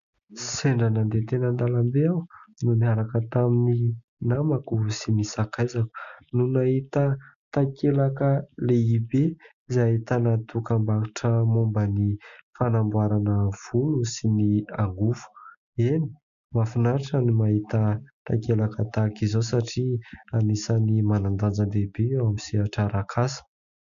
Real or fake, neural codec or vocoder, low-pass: real; none; 7.2 kHz